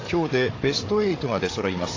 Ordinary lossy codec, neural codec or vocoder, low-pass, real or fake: AAC, 32 kbps; codec, 16 kHz, 8 kbps, FreqCodec, larger model; 7.2 kHz; fake